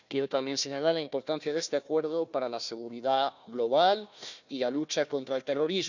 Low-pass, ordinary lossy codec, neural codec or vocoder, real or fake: 7.2 kHz; none; codec, 16 kHz, 1 kbps, FunCodec, trained on Chinese and English, 50 frames a second; fake